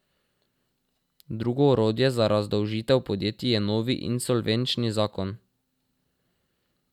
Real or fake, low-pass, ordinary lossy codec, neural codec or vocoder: real; 19.8 kHz; none; none